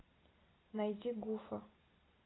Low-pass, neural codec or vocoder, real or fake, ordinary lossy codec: 7.2 kHz; none; real; AAC, 16 kbps